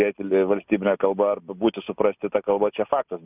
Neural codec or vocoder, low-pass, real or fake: none; 3.6 kHz; real